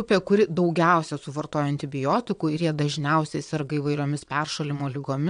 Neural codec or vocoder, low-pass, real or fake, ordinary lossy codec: vocoder, 22.05 kHz, 80 mel bands, Vocos; 9.9 kHz; fake; MP3, 64 kbps